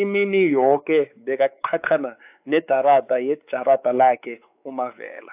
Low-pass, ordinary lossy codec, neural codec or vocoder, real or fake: 3.6 kHz; AAC, 32 kbps; codec, 16 kHz, 4 kbps, X-Codec, WavLM features, trained on Multilingual LibriSpeech; fake